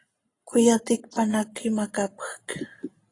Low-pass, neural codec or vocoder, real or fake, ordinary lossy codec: 10.8 kHz; none; real; AAC, 32 kbps